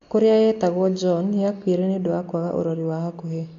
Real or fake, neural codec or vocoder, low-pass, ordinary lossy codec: real; none; 7.2 kHz; AAC, 48 kbps